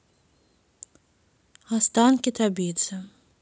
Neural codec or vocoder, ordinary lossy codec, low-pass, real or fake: none; none; none; real